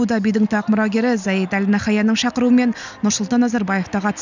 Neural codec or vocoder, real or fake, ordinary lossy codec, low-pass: none; real; none; 7.2 kHz